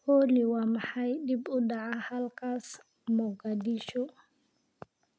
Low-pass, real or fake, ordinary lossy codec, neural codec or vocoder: none; real; none; none